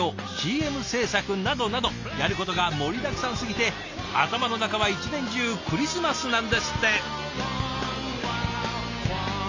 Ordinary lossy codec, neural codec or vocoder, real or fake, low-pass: AAC, 48 kbps; none; real; 7.2 kHz